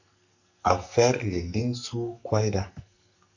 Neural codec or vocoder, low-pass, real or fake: codec, 44.1 kHz, 2.6 kbps, SNAC; 7.2 kHz; fake